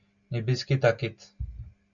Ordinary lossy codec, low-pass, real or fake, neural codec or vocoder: MP3, 96 kbps; 7.2 kHz; real; none